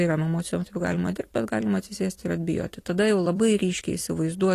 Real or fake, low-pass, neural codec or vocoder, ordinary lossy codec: fake; 14.4 kHz; vocoder, 44.1 kHz, 128 mel bands every 256 samples, BigVGAN v2; AAC, 48 kbps